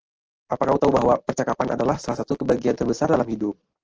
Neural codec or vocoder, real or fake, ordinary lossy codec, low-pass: none; real; Opus, 16 kbps; 7.2 kHz